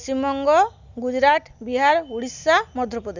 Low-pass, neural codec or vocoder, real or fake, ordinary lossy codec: 7.2 kHz; none; real; none